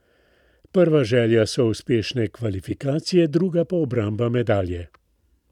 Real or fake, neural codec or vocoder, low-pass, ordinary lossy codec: fake; vocoder, 44.1 kHz, 128 mel bands every 512 samples, BigVGAN v2; 19.8 kHz; none